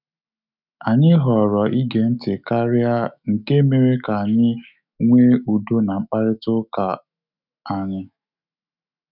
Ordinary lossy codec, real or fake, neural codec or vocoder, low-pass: none; fake; autoencoder, 48 kHz, 128 numbers a frame, DAC-VAE, trained on Japanese speech; 5.4 kHz